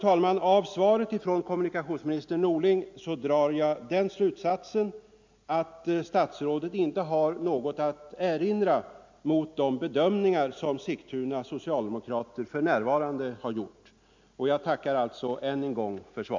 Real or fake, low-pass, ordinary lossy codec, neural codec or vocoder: real; 7.2 kHz; MP3, 64 kbps; none